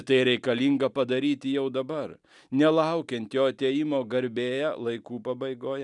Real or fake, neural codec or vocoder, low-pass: real; none; 10.8 kHz